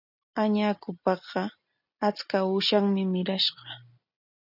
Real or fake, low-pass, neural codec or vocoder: real; 5.4 kHz; none